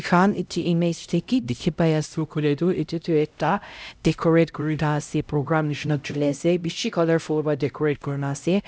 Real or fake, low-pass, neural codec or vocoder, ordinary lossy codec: fake; none; codec, 16 kHz, 0.5 kbps, X-Codec, HuBERT features, trained on LibriSpeech; none